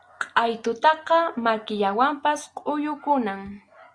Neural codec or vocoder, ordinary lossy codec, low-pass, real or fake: none; Opus, 64 kbps; 9.9 kHz; real